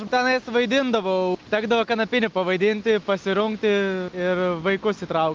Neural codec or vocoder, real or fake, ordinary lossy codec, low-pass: none; real; Opus, 32 kbps; 7.2 kHz